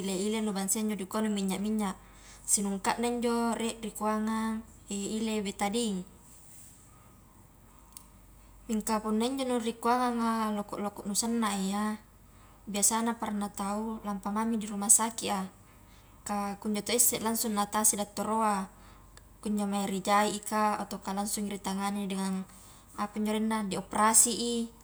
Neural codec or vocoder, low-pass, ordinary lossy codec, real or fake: none; none; none; real